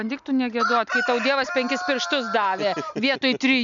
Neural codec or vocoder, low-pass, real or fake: none; 7.2 kHz; real